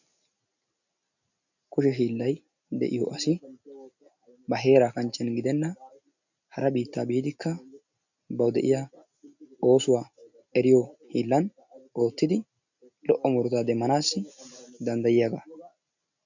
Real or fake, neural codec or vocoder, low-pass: real; none; 7.2 kHz